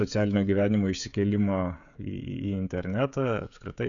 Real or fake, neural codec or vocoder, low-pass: fake; codec, 16 kHz, 16 kbps, FreqCodec, smaller model; 7.2 kHz